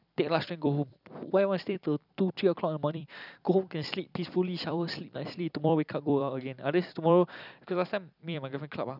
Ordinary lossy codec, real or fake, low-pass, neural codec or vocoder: none; fake; 5.4 kHz; vocoder, 44.1 kHz, 128 mel bands every 256 samples, BigVGAN v2